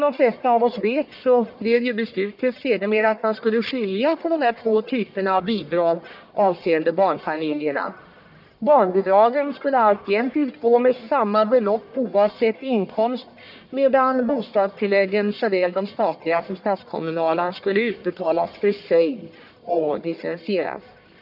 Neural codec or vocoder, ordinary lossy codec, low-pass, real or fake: codec, 44.1 kHz, 1.7 kbps, Pupu-Codec; none; 5.4 kHz; fake